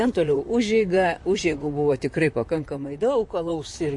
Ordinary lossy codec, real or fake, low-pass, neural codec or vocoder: MP3, 48 kbps; fake; 10.8 kHz; vocoder, 44.1 kHz, 128 mel bands, Pupu-Vocoder